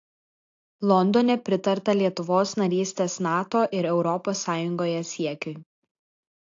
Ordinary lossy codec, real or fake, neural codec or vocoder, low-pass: AAC, 48 kbps; real; none; 7.2 kHz